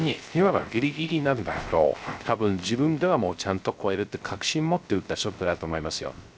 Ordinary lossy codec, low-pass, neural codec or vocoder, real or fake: none; none; codec, 16 kHz, 0.3 kbps, FocalCodec; fake